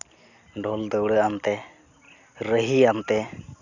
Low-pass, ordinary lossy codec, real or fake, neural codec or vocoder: 7.2 kHz; none; real; none